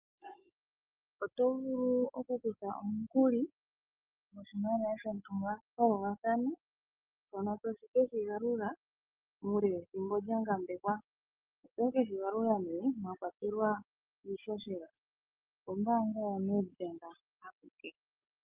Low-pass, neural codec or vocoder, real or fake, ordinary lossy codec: 3.6 kHz; none; real; Opus, 24 kbps